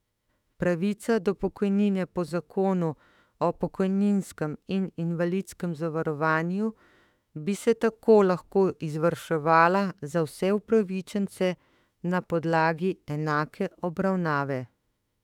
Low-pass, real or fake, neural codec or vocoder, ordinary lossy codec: 19.8 kHz; fake; autoencoder, 48 kHz, 32 numbers a frame, DAC-VAE, trained on Japanese speech; none